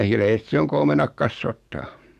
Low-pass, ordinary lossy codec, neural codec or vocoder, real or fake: 14.4 kHz; none; codec, 44.1 kHz, 7.8 kbps, DAC; fake